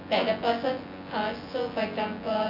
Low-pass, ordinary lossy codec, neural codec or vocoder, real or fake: 5.4 kHz; none; vocoder, 24 kHz, 100 mel bands, Vocos; fake